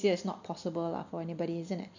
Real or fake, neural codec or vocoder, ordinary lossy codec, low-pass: real; none; none; 7.2 kHz